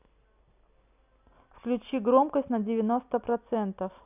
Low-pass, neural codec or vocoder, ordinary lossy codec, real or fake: 3.6 kHz; none; none; real